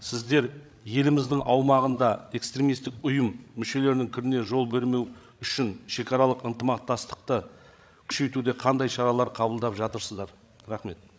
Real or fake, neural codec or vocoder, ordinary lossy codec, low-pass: fake; codec, 16 kHz, 16 kbps, FreqCodec, larger model; none; none